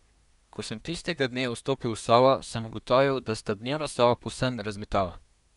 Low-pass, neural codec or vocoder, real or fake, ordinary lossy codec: 10.8 kHz; codec, 24 kHz, 1 kbps, SNAC; fake; none